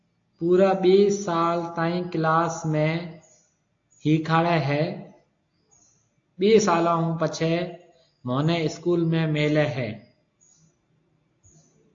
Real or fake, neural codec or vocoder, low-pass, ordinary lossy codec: real; none; 7.2 kHz; AAC, 48 kbps